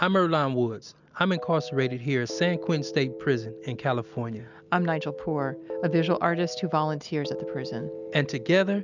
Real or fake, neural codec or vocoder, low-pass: real; none; 7.2 kHz